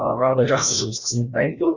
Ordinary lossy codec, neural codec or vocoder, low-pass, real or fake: Opus, 64 kbps; codec, 16 kHz, 1 kbps, FreqCodec, larger model; 7.2 kHz; fake